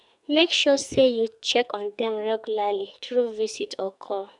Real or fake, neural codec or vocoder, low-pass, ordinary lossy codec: fake; codec, 44.1 kHz, 2.6 kbps, SNAC; 10.8 kHz; none